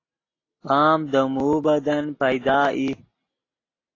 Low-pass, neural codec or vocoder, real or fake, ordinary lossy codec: 7.2 kHz; none; real; AAC, 32 kbps